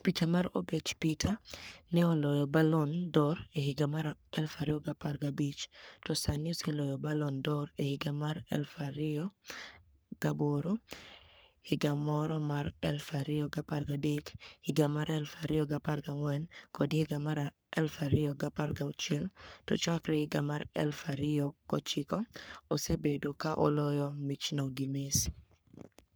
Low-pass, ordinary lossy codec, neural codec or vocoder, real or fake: none; none; codec, 44.1 kHz, 3.4 kbps, Pupu-Codec; fake